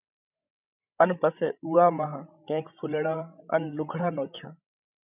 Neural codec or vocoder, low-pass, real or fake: codec, 16 kHz, 16 kbps, FreqCodec, larger model; 3.6 kHz; fake